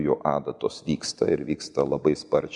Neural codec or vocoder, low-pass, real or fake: none; 10.8 kHz; real